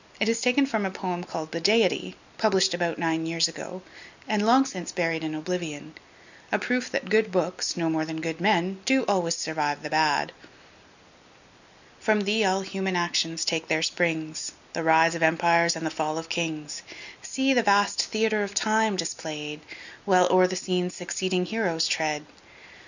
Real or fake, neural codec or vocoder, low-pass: real; none; 7.2 kHz